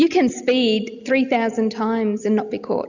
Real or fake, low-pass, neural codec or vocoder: real; 7.2 kHz; none